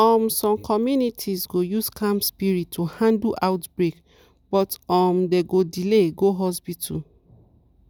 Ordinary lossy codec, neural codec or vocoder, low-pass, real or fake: none; none; none; real